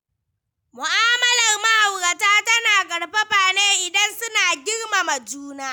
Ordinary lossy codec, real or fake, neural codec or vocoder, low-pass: none; real; none; none